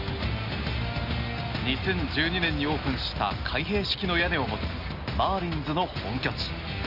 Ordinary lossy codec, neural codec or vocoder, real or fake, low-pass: Opus, 64 kbps; none; real; 5.4 kHz